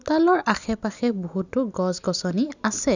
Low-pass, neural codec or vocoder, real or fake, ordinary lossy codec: 7.2 kHz; none; real; none